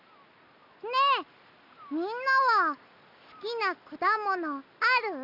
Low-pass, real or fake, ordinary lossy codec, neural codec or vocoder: 5.4 kHz; real; none; none